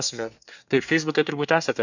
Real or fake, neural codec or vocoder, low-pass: fake; codec, 24 kHz, 1 kbps, SNAC; 7.2 kHz